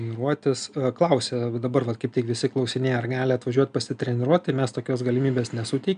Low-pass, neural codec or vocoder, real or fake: 9.9 kHz; none; real